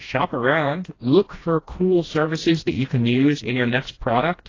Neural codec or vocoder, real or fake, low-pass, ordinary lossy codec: codec, 16 kHz, 1 kbps, FreqCodec, smaller model; fake; 7.2 kHz; AAC, 32 kbps